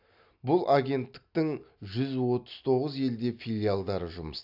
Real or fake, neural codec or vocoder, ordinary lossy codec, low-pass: real; none; AAC, 48 kbps; 5.4 kHz